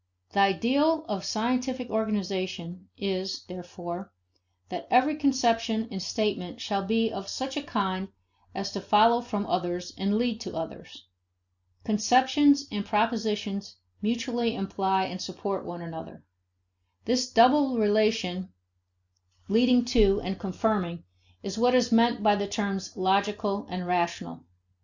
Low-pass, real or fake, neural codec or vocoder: 7.2 kHz; real; none